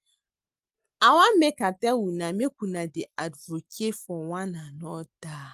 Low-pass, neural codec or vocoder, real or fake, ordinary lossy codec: 14.4 kHz; none; real; Opus, 32 kbps